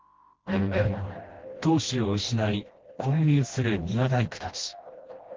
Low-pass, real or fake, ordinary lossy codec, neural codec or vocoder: 7.2 kHz; fake; Opus, 16 kbps; codec, 16 kHz, 1 kbps, FreqCodec, smaller model